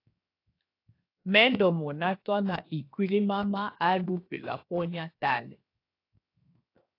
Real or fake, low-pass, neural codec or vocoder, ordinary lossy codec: fake; 5.4 kHz; codec, 16 kHz, 0.7 kbps, FocalCodec; AAC, 32 kbps